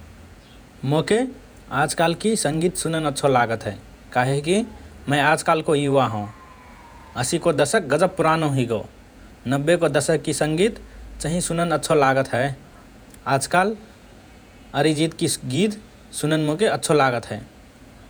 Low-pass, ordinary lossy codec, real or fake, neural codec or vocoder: none; none; fake; vocoder, 48 kHz, 128 mel bands, Vocos